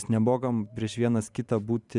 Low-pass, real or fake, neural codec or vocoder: 10.8 kHz; fake; vocoder, 44.1 kHz, 128 mel bands every 512 samples, BigVGAN v2